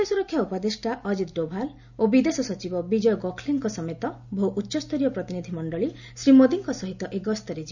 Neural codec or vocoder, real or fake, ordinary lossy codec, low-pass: none; real; none; 7.2 kHz